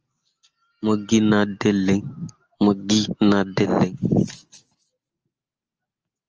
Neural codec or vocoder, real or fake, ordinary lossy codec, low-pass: none; real; Opus, 24 kbps; 7.2 kHz